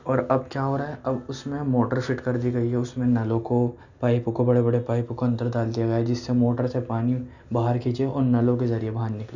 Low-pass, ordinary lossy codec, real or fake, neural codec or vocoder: 7.2 kHz; none; real; none